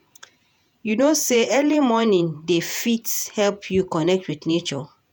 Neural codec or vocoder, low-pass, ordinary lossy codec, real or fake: vocoder, 48 kHz, 128 mel bands, Vocos; none; none; fake